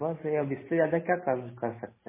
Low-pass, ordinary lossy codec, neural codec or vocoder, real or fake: 3.6 kHz; MP3, 16 kbps; none; real